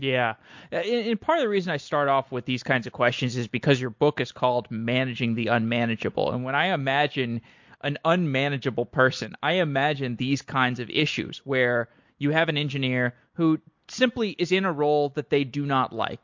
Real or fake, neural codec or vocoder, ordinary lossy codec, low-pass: real; none; MP3, 48 kbps; 7.2 kHz